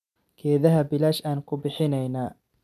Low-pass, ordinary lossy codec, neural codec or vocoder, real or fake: 14.4 kHz; none; none; real